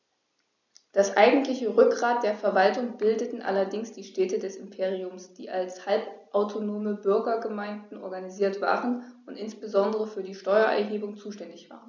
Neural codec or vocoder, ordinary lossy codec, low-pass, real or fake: none; none; 7.2 kHz; real